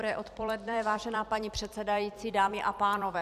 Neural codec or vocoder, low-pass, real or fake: vocoder, 44.1 kHz, 128 mel bands every 256 samples, BigVGAN v2; 14.4 kHz; fake